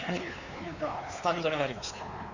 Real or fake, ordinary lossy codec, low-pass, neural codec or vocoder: fake; none; 7.2 kHz; codec, 16 kHz, 4 kbps, X-Codec, WavLM features, trained on Multilingual LibriSpeech